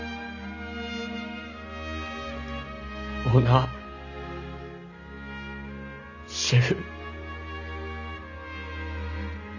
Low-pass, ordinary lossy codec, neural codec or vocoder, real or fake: 7.2 kHz; none; none; real